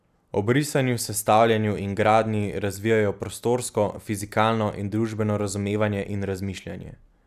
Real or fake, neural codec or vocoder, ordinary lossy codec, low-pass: real; none; none; 14.4 kHz